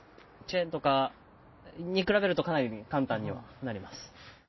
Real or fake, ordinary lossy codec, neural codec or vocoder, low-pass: real; MP3, 24 kbps; none; 7.2 kHz